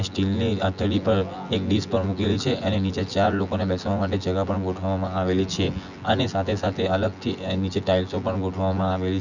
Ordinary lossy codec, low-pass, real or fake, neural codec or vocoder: none; 7.2 kHz; fake; vocoder, 24 kHz, 100 mel bands, Vocos